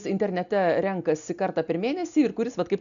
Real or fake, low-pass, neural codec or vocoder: real; 7.2 kHz; none